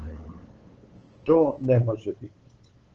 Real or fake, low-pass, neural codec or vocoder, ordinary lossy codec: fake; 7.2 kHz; codec, 16 kHz, 8 kbps, FunCodec, trained on LibriTTS, 25 frames a second; Opus, 16 kbps